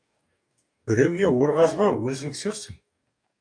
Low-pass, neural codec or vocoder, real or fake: 9.9 kHz; codec, 44.1 kHz, 2.6 kbps, DAC; fake